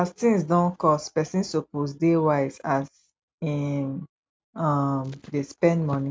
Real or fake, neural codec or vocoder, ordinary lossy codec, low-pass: real; none; none; none